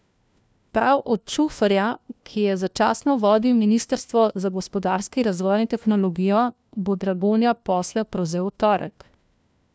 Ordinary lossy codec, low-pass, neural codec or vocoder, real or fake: none; none; codec, 16 kHz, 1 kbps, FunCodec, trained on LibriTTS, 50 frames a second; fake